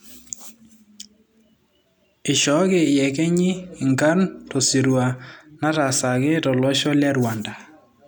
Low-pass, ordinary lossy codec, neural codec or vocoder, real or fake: none; none; none; real